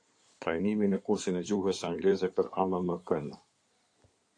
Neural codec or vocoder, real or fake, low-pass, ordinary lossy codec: codec, 16 kHz in and 24 kHz out, 2.2 kbps, FireRedTTS-2 codec; fake; 9.9 kHz; AAC, 48 kbps